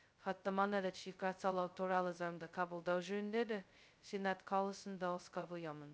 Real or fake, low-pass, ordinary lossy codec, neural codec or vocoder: fake; none; none; codec, 16 kHz, 0.2 kbps, FocalCodec